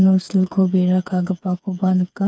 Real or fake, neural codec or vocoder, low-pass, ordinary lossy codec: fake; codec, 16 kHz, 4 kbps, FreqCodec, smaller model; none; none